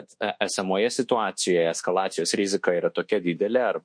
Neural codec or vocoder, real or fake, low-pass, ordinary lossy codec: codec, 24 kHz, 1.2 kbps, DualCodec; fake; 9.9 kHz; MP3, 48 kbps